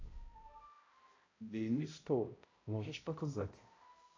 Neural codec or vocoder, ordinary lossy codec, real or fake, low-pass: codec, 16 kHz, 0.5 kbps, X-Codec, HuBERT features, trained on balanced general audio; AAC, 32 kbps; fake; 7.2 kHz